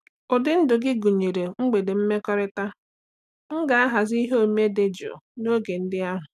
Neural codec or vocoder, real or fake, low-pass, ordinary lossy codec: none; real; 14.4 kHz; none